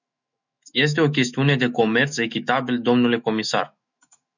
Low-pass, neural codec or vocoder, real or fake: 7.2 kHz; autoencoder, 48 kHz, 128 numbers a frame, DAC-VAE, trained on Japanese speech; fake